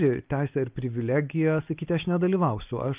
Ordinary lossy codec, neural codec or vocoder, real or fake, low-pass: Opus, 24 kbps; none; real; 3.6 kHz